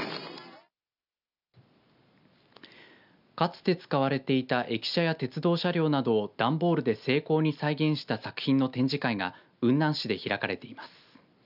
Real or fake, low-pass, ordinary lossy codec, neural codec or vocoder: real; 5.4 kHz; none; none